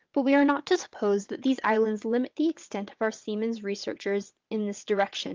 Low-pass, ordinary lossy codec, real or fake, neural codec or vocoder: 7.2 kHz; Opus, 24 kbps; fake; vocoder, 22.05 kHz, 80 mel bands, WaveNeXt